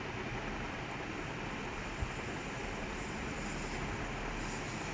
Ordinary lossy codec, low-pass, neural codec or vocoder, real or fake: none; none; none; real